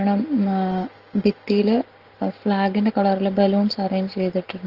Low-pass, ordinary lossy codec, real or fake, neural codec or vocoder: 5.4 kHz; Opus, 16 kbps; real; none